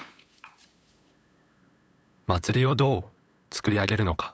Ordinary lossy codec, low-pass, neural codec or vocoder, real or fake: none; none; codec, 16 kHz, 8 kbps, FunCodec, trained on LibriTTS, 25 frames a second; fake